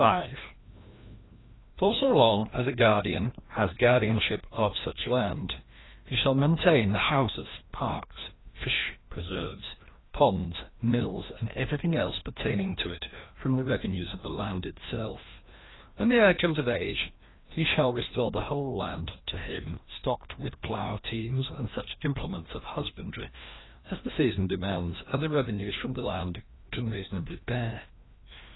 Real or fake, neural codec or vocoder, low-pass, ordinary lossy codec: fake; codec, 16 kHz, 1 kbps, FreqCodec, larger model; 7.2 kHz; AAC, 16 kbps